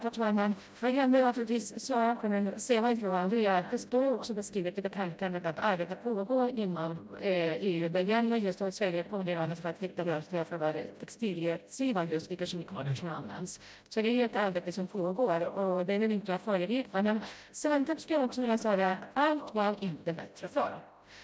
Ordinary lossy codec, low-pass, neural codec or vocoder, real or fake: none; none; codec, 16 kHz, 0.5 kbps, FreqCodec, smaller model; fake